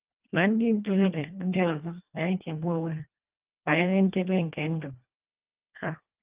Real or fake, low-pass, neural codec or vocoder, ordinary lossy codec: fake; 3.6 kHz; codec, 24 kHz, 1.5 kbps, HILCodec; Opus, 24 kbps